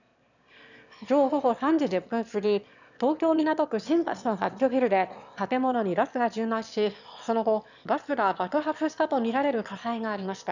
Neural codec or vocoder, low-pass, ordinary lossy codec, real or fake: autoencoder, 22.05 kHz, a latent of 192 numbers a frame, VITS, trained on one speaker; 7.2 kHz; none; fake